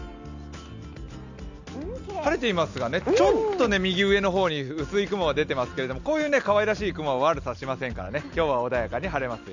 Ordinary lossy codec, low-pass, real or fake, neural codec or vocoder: none; 7.2 kHz; real; none